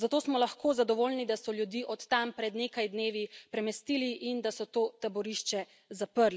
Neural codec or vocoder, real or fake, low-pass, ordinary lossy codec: none; real; none; none